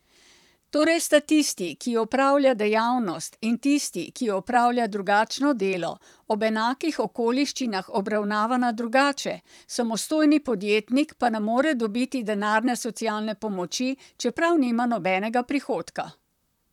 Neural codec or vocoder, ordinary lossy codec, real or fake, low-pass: vocoder, 44.1 kHz, 128 mel bands, Pupu-Vocoder; none; fake; 19.8 kHz